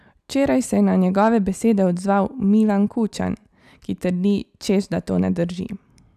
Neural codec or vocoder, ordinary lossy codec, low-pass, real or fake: none; none; 14.4 kHz; real